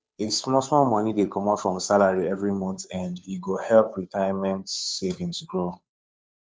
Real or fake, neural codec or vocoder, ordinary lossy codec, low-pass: fake; codec, 16 kHz, 2 kbps, FunCodec, trained on Chinese and English, 25 frames a second; none; none